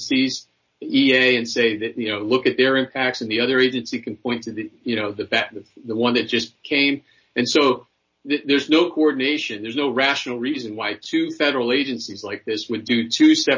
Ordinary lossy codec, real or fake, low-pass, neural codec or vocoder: MP3, 32 kbps; real; 7.2 kHz; none